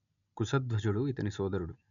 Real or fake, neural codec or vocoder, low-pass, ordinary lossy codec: real; none; 7.2 kHz; none